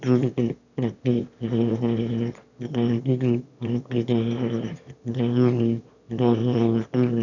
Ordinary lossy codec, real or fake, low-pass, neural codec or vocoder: none; fake; 7.2 kHz; autoencoder, 22.05 kHz, a latent of 192 numbers a frame, VITS, trained on one speaker